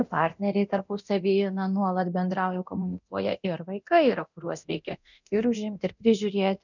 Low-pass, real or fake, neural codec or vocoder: 7.2 kHz; fake; codec, 24 kHz, 0.9 kbps, DualCodec